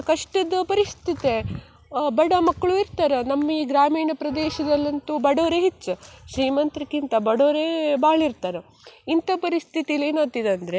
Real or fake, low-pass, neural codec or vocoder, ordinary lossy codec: real; none; none; none